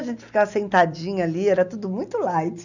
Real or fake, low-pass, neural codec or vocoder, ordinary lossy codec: real; 7.2 kHz; none; none